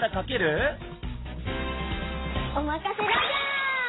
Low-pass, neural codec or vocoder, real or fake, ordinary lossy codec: 7.2 kHz; none; real; AAC, 16 kbps